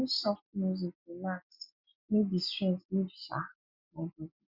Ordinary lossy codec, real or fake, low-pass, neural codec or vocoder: Opus, 64 kbps; real; 5.4 kHz; none